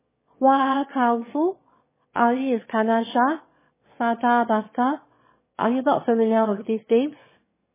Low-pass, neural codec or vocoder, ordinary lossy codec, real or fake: 3.6 kHz; autoencoder, 22.05 kHz, a latent of 192 numbers a frame, VITS, trained on one speaker; MP3, 16 kbps; fake